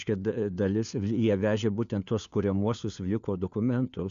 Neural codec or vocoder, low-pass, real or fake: codec, 16 kHz, 4 kbps, FunCodec, trained on LibriTTS, 50 frames a second; 7.2 kHz; fake